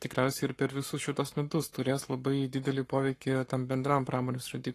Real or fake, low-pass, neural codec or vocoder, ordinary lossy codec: fake; 14.4 kHz; codec, 44.1 kHz, 7.8 kbps, Pupu-Codec; AAC, 48 kbps